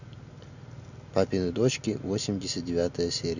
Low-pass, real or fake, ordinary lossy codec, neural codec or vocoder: 7.2 kHz; real; none; none